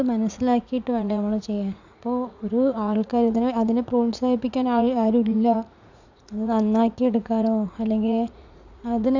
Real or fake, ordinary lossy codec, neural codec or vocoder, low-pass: fake; none; vocoder, 44.1 kHz, 80 mel bands, Vocos; 7.2 kHz